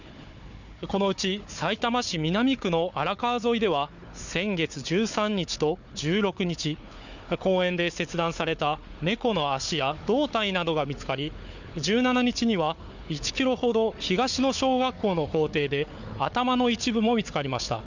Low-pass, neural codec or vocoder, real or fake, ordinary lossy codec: 7.2 kHz; codec, 16 kHz, 4 kbps, FunCodec, trained on Chinese and English, 50 frames a second; fake; none